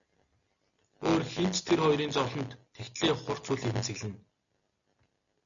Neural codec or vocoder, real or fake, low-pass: none; real; 7.2 kHz